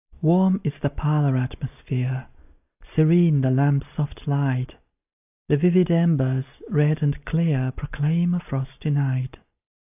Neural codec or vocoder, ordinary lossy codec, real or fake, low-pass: none; AAC, 32 kbps; real; 3.6 kHz